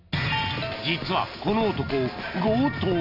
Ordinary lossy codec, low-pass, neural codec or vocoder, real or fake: none; 5.4 kHz; none; real